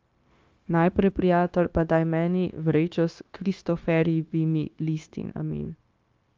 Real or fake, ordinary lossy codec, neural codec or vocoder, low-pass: fake; Opus, 32 kbps; codec, 16 kHz, 0.9 kbps, LongCat-Audio-Codec; 7.2 kHz